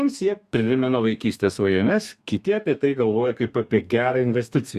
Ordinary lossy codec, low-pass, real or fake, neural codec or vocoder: Opus, 64 kbps; 14.4 kHz; fake; codec, 32 kHz, 1.9 kbps, SNAC